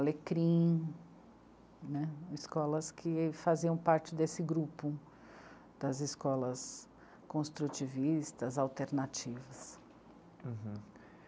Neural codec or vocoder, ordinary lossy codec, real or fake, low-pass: none; none; real; none